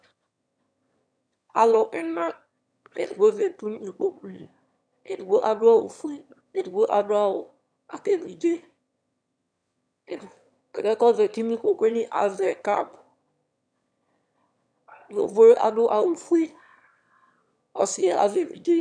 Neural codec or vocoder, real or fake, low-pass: autoencoder, 22.05 kHz, a latent of 192 numbers a frame, VITS, trained on one speaker; fake; 9.9 kHz